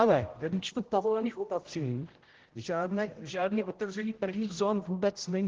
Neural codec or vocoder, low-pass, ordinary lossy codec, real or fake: codec, 16 kHz, 0.5 kbps, X-Codec, HuBERT features, trained on general audio; 7.2 kHz; Opus, 16 kbps; fake